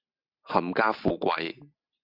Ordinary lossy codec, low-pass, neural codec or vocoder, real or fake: Opus, 64 kbps; 5.4 kHz; none; real